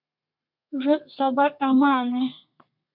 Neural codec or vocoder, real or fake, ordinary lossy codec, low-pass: codec, 32 kHz, 1.9 kbps, SNAC; fake; MP3, 48 kbps; 5.4 kHz